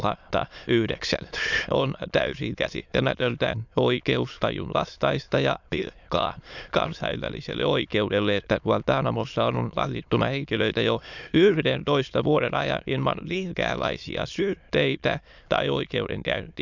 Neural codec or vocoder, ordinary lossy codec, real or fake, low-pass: autoencoder, 22.05 kHz, a latent of 192 numbers a frame, VITS, trained on many speakers; none; fake; 7.2 kHz